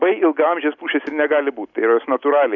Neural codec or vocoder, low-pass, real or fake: none; 7.2 kHz; real